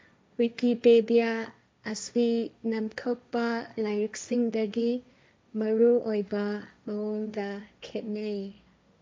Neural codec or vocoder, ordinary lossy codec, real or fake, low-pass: codec, 16 kHz, 1.1 kbps, Voila-Tokenizer; none; fake; none